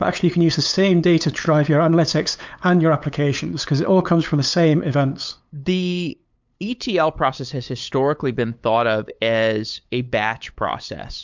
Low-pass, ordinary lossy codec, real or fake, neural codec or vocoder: 7.2 kHz; MP3, 64 kbps; fake; codec, 16 kHz, 8 kbps, FunCodec, trained on LibriTTS, 25 frames a second